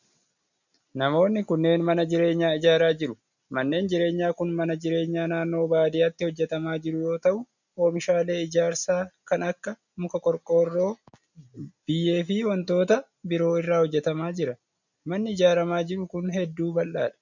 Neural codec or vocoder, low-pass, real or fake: none; 7.2 kHz; real